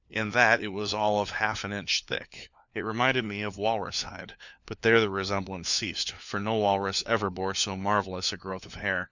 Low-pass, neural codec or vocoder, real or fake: 7.2 kHz; codec, 16 kHz, 4 kbps, FunCodec, trained on LibriTTS, 50 frames a second; fake